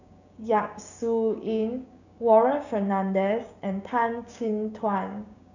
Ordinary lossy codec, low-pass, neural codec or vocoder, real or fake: none; 7.2 kHz; codec, 16 kHz, 6 kbps, DAC; fake